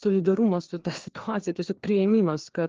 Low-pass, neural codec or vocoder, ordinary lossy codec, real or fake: 7.2 kHz; codec, 16 kHz, 2 kbps, FreqCodec, larger model; Opus, 16 kbps; fake